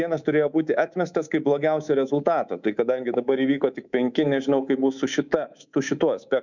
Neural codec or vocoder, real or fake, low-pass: codec, 24 kHz, 3.1 kbps, DualCodec; fake; 7.2 kHz